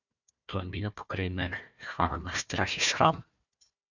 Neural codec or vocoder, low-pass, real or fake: codec, 16 kHz, 1 kbps, FunCodec, trained on Chinese and English, 50 frames a second; 7.2 kHz; fake